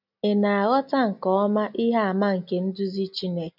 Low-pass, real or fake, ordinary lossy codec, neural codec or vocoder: 5.4 kHz; real; none; none